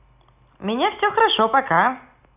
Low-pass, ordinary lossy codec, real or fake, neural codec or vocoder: 3.6 kHz; none; real; none